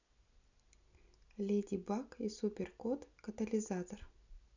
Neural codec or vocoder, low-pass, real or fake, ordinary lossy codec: none; 7.2 kHz; real; none